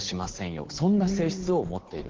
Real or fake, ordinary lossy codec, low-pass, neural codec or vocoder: fake; Opus, 16 kbps; 7.2 kHz; codec, 16 kHz, 8 kbps, FunCodec, trained on Chinese and English, 25 frames a second